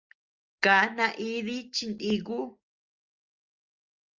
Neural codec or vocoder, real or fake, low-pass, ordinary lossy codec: none; real; 7.2 kHz; Opus, 32 kbps